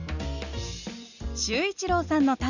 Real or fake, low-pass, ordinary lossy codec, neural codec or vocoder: real; 7.2 kHz; none; none